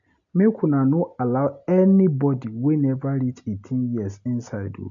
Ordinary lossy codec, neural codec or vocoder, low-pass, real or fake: none; none; 7.2 kHz; real